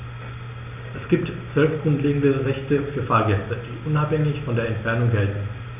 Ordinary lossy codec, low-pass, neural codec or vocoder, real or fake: none; 3.6 kHz; none; real